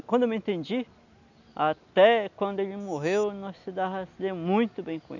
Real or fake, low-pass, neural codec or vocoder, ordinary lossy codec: real; 7.2 kHz; none; none